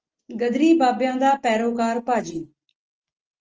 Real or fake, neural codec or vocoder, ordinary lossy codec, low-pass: real; none; Opus, 24 kbps; 7.2 kHz